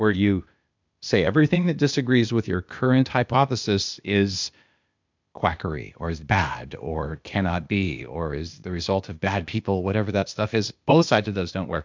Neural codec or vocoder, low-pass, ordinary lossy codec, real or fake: codec, 16 kHz, 0.8 kbps, ZipCodec; 7.2 kHz; MP3, 64 kbps; fake